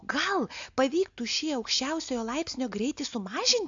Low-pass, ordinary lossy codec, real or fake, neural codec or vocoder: 7.2 kHz; AAC, 64 kbps; real; none